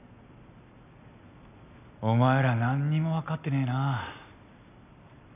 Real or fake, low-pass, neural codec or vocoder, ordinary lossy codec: real; 3.6 kHz; none; none